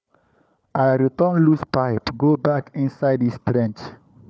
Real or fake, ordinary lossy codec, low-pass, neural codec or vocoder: fake; none; none; codec, 16 kHz, 4 kbps, FunCodec, trained on Chinese and English, 50 frames a second